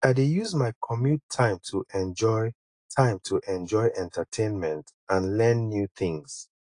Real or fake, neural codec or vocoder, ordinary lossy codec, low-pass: real; none; AAC, 48 kbps; 9.9 kHz